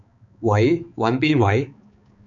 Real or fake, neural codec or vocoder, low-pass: fake; codec, 16 kHz, 4 kbps, X-Codec, HuBERT features, trained on balanced general audio; 7.2 kHz